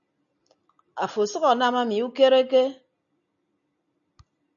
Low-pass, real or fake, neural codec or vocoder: 7.2 kHz; real; none